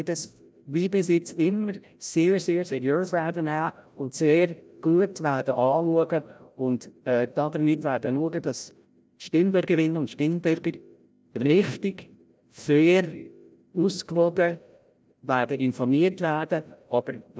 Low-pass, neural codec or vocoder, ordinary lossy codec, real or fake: none; codec, 16 kHz, 0.5 kbps, FreqCodec, larger model; none; fake